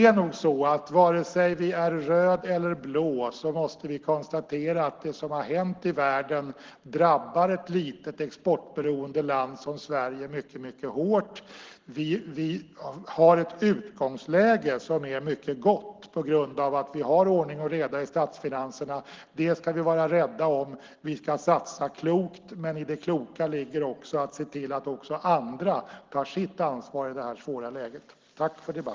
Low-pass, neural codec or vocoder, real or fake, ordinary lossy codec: 7.2 kHz; none; real; Opus, 16 kbps